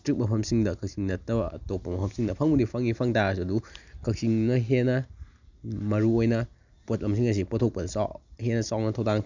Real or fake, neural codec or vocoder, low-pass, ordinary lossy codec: real; none; 7.2 kHz; none